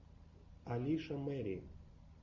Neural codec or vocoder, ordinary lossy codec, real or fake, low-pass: none; MP3, 64 kbps; real; 7.2 kHz